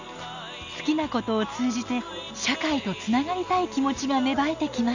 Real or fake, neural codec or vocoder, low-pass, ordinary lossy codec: real; none; 7.2 kHz; Opus, 64 kbps